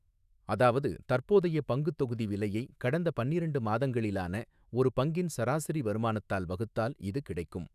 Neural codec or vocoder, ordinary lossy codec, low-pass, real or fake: autoencoder, 48 kHz, 128 numbers a frame, DAC-VAE, trained on Japanese speech; none; 14.4 kHz; fake